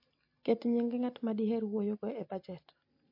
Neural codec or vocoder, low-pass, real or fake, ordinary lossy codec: none; 5.4 kHz; real; MP3, 32 kbps